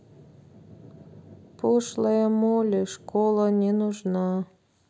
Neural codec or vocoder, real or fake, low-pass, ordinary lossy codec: none; real; none; none